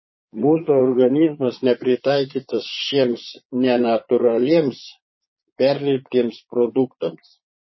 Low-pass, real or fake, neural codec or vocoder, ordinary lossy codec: 7.2 kHz; fake; vocoder, 22.05 kHz, 80 mel bands, Vocos; MP3, 24 kbps